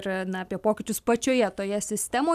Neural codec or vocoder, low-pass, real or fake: none; 14.4 kHz; real